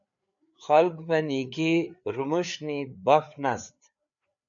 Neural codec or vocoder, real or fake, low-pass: codec, 16 kHz, 4 kbps, FreqCodec, larger model; fake; 7.2 kHz